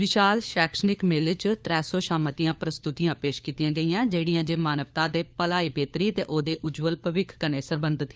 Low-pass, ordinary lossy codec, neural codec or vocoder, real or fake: none; none; codec, 16 kHz, 4 kbps, FunCodec, trained on LibriTTS, 50 frames a second; fake